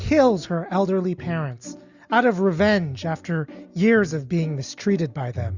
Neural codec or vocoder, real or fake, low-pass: none; real; 7.2 kHz